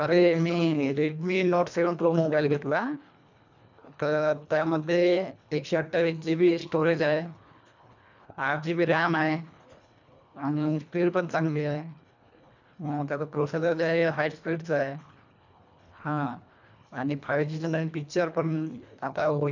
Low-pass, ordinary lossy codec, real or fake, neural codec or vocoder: 7.2 kHz; none; fake; codec, 24 kHz, 1.5 kbps, HILCodec